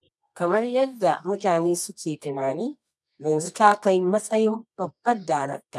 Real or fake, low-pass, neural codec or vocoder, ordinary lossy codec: fake; none; codec, 24 kHz, 0.9 kbps, WavTokenizer, medium music audio release; none